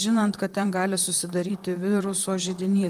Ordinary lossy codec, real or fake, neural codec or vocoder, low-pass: Opus, 32 kbps; fake; vocoder, 44.1 kHz, 128 mel bands, Pupu-Vocoder; 14.4 kHz